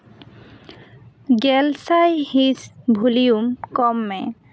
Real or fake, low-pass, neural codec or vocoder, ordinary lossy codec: real; none; none; none